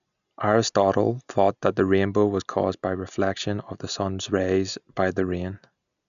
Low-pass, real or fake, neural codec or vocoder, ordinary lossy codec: 7.2 kHz; real; none; none